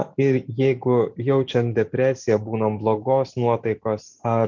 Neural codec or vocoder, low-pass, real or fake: none; 7.2 kHz; real